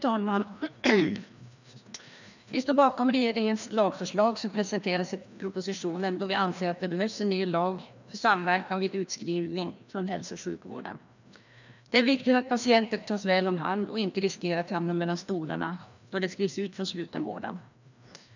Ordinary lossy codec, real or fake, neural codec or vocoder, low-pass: none; fake; codec, 16 kHz, 1 kbps, FreqCodec, larger model; 7.2 kHz